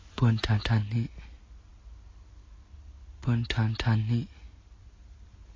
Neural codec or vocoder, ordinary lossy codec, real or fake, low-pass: none; AAC, 32 kbps; real; 7.2 kHz